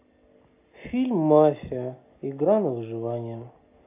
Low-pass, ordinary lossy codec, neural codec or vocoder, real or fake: 3.6 kHz; AAC, 24 kbps; none; real